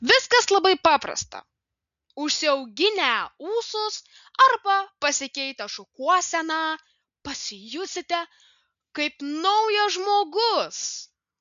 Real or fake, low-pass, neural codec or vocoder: real; 7.2 kHz; none